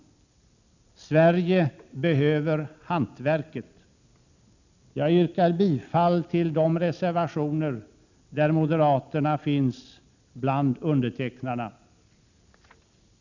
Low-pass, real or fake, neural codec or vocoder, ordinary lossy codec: 7.2 kHz; real; none; none